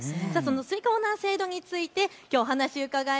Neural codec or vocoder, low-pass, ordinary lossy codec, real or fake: none; none; none; real